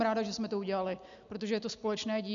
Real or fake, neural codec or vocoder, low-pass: real; none; 7.2 kHz